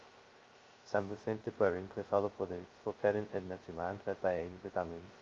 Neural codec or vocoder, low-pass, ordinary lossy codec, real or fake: codec, 16 kHz, 0.2 kbps, FocalCodec; 7.2 kHz; Opus, 32 kbps; fake